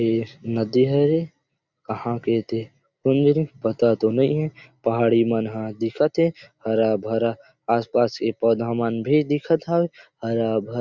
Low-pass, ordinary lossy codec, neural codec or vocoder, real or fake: 7.2 kHz; Opus, 64 kbps; none; real